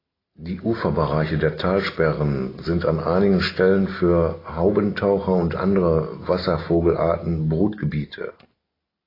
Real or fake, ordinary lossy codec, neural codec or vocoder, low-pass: real; AAC, 24 kbps; none; 5.4 kHz